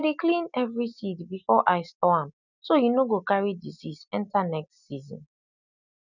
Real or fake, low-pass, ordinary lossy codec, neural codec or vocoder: real; 7.2 kHz; none; none